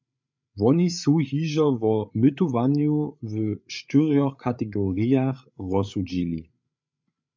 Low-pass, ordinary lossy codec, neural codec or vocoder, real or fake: 7.2 kHz; MP3, 64 kbps; codec, 16 kHz, 8 kbps, FreqCodec, larger model; fake